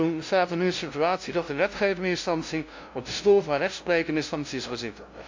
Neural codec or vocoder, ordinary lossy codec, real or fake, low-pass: codec, 16 kHz, 0.5 kbps, FunCodec, trained on LibriTTS, 25 frames a second; MP3, 64 kbps; fake; 7.2 kHz